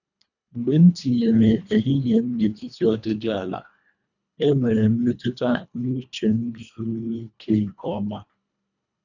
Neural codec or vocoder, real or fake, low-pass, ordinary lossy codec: codec, 24 kHz, 1.5 kbps, HILCodec; fake; 7.2 kHz; none